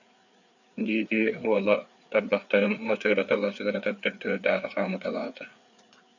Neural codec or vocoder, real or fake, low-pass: codec, 16 kHz, 4 kbps, FreqCodec, larger model; fake; 7.2 kHz